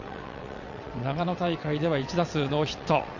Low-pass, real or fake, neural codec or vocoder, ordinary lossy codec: 7.2 kHz; fake; vocoder, 22.05 kHz, 80 mel bands, WaveNeXt; none